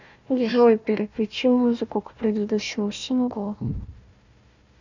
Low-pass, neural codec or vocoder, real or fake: 7.2 kHz; codec, 16 kHz, 1 kbps, FunCodec, trained on Chinese and English, 50 frames a second; fake